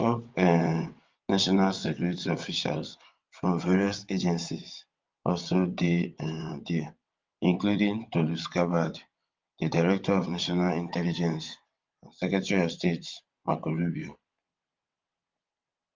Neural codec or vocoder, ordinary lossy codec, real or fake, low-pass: vocoder, 22.05 kHz, 80 mel bands, WaveNeXt; Opus, 32 kbps; fake; 7.2 kHz